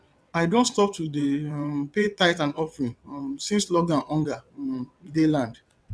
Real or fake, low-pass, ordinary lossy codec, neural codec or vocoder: fake; none; none; vocoder, 22.05 kHz, 80 mel bands, WaveNeXt